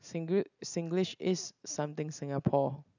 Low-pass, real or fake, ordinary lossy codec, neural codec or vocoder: 7.2 kHz; real; none; none